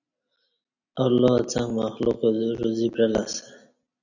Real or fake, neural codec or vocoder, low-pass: real; none; 7.2 kHz